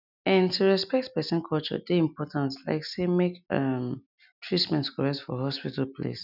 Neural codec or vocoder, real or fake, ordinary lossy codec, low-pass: none; real; none; 5.4 kHz